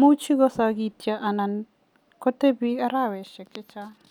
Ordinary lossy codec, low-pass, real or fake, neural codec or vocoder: none; 19.8 kHz; real; none